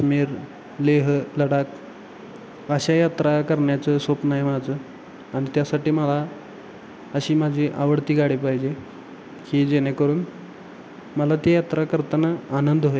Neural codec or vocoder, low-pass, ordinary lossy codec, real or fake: none; none; none; real